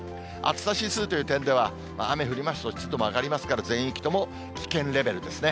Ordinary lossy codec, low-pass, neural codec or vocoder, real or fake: none; none; none; real